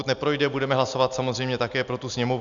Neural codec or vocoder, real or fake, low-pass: none; real; 7.2 kHz